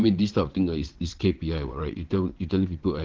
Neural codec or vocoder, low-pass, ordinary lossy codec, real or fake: vocoder, 22.05 kHz, 80 mel bands, WaveNeXt; 7.2 kHz; Opus, 16 kbps; fake